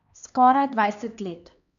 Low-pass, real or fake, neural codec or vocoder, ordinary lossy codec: 7.2 kHz; fake; codec, 16 kHz, 2 kbps, X-Codec, HuBERT features, trained on LibriSpeech; none